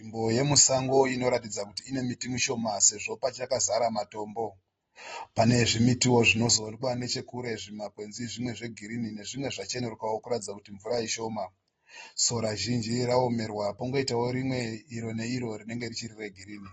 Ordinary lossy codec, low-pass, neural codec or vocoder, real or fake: AAC, 24 kbps; 9.9 kHz; none; real